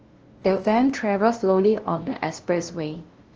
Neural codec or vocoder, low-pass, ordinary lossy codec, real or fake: codec, 16 kHz, 0.5 kbps, FunCodec, trained on LibriTTS, 25 frames a second; 7.2 kHz; Opus, 16 kbps; fake